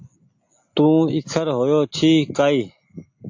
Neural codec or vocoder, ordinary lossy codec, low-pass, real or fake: none; AAC, 48 kbps; 7.2 kHz; real